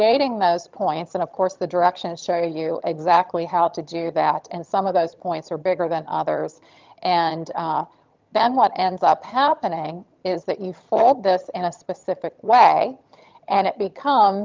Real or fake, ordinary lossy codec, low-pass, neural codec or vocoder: fake; Opus, 24 kbps; 7.2 kHz; vocoder, 22.05 kHz, 80 mel bands, HiFi-GAN